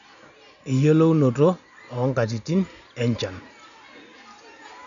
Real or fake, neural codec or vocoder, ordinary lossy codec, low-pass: real; none; none; 7.2 kHz